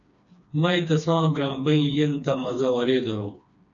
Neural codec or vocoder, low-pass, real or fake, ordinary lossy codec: codec, 16 kHz, 2 kbps, FreqCodec, smaller model; 7.2 kHz; fake; AAC, 64 kbps